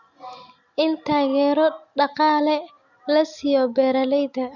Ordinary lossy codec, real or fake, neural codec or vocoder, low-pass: none; real; none; 7.2 kHz